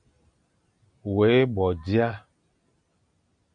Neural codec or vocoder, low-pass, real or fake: none; 9.9 kHz; real